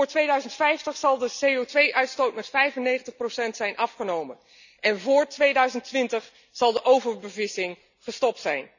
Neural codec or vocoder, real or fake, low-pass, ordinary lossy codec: none; real; 7.2 kHz; none